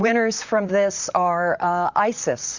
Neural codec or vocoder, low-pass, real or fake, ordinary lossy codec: vocoder, 44.1 kHz, 128 mel bands, Pupu-Vocoder; 7.2 kHz; fake; Opus, 64 kbps